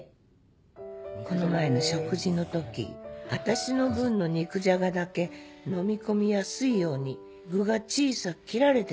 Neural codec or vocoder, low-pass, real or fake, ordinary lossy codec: none; none; real; none